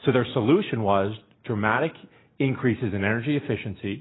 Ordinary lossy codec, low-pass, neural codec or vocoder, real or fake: AAC, 16 kbps; 7.2 kHz; none; real